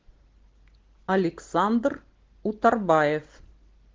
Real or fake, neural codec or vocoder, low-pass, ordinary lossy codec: real; none; 7.2 kHz; Opus, 16 kbps